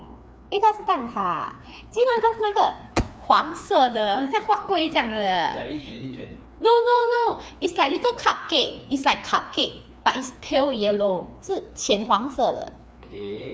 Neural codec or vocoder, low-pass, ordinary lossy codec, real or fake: codec, 16 kHz, 2 kbps, FreqCodec, larger model; none; none; fake